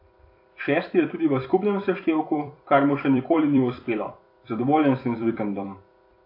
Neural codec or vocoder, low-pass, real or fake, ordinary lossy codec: vocoder, 44.1 kHz, 128 mel bands every 256 samples, BigVGAN v2; 5.4 kHz; fake; AAC, 32 kbps